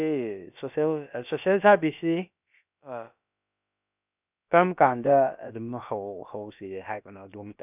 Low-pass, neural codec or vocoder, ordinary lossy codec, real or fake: 3.6 kHz; codec, 16 kHz, about 1 kbps, DyCAST, with the encoder's durations; none; fake